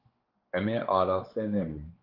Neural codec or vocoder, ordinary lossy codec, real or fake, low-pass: codec, 16 kHz, 4 kbps, X-Codec, WavLM features, trained on Multilingual LibriSpeech; Opus, 16 kbps; fake; 5.4 kHz